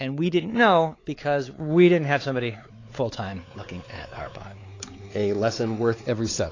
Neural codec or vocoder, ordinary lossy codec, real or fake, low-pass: codec, 16 kHz, 4 kbps, X-Codec, WavLM features, trained on Multilingual LibriSpeech; AAC, 32 kbps; fake; 7.2 kHz